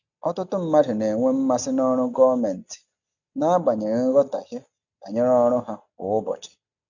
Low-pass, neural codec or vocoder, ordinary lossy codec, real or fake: 7.2 kHz; none; none; real